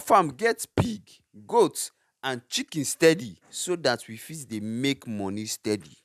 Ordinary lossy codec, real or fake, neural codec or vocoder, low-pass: none; real; none; 14.4 kHz